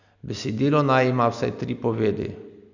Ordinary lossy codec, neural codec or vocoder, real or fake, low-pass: none; none; real; 7.2 kHz